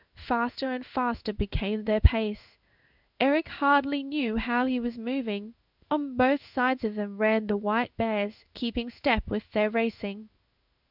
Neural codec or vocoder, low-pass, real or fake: codec, 16 kHz in and 24 kHz out, 1 kbps, XY-Tokenizer; 5.4 kHz; fake